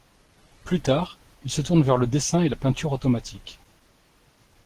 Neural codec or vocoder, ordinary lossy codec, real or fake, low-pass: none; Opus, 16 kbps; real; 14.4 kHz